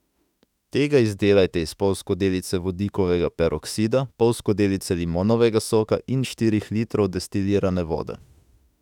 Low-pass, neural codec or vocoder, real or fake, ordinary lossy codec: 19.8 kHz; autoencoder, 48 kHz, 32 numbers a frame, DAC-VAE, trained on Japanese speech; fake; none